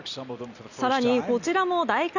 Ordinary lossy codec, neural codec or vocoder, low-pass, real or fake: none; none; 7.2 kHz; real